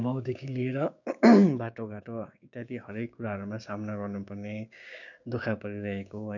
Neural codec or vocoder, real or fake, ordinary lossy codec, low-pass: codec, 16 kHz, 6 kbps, DAC; fake; none; 7.2 kHz